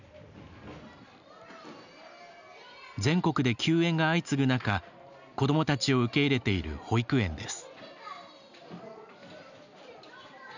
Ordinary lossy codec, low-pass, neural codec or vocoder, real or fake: none; 7.2 kHz; none; real